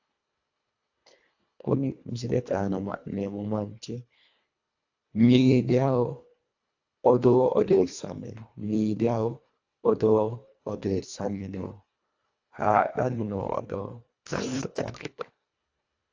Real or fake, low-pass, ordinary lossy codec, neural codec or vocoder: fake; 7.2 kHz; AAC, 48 kbps; codec, 24 kHz, 1.5 kbps, HILCodec